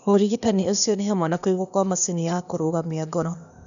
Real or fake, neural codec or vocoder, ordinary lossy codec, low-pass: fake; codec, 16 kHz, 0.8 kbps, ZipCodec; none; 7.2 kHz